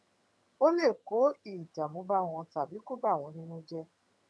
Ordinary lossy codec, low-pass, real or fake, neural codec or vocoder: none; none; fake; vocoder, 22.05 kHz, 80 mel bands, HiFi-GAN